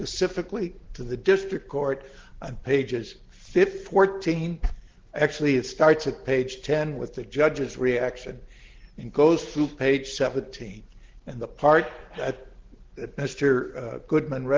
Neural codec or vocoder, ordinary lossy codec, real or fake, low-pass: none; Opus, 16 kbps; real; 7.2 kHz